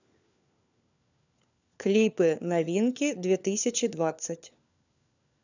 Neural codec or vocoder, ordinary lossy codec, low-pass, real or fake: codec, 16 kHz, 4 kbps, FunCodec, trained on LibriTTS, 50 frames a second; none; 7.2 kHz; fake